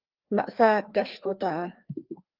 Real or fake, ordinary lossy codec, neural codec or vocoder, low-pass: fake; Opus, 32 kbps; codec, 16 kHz, 2 kbps, FreqCodec, larger model; 5.4 kHz